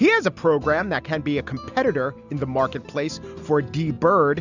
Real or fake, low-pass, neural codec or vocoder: real; 7.2 kHz; none